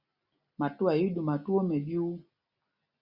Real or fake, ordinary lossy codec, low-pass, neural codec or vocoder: real; Opus, 64 kbps; 5.4 kHz; none